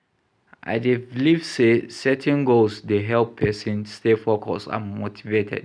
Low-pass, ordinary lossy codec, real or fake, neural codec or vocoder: 9.9 kHz; none; real; none